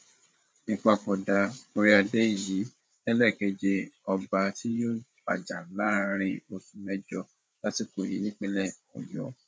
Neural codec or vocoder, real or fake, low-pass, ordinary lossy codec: codec, 16 kHz, 8 kbps, FreqCodec, larger model; fake; none; none